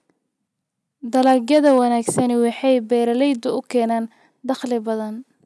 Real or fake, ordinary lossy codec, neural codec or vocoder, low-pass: real; none; none; none